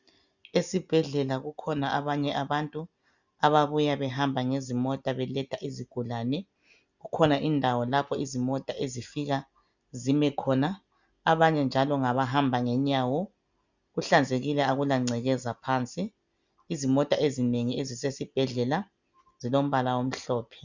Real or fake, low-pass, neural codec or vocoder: real; 7.2 kHz; none